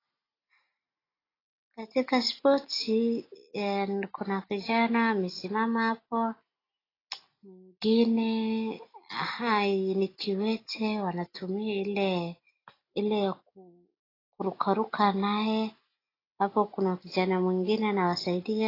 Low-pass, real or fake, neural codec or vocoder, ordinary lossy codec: 5.4 kHz; real; none; AAC, 24 kbps